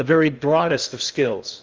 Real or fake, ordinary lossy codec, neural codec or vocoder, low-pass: fake; Opus, 32 kbps; codec, 16 kHz in and 24 kHz out, 0.6 kbps, FocalCodec, streaming, 2048 codes; 7.2 kHz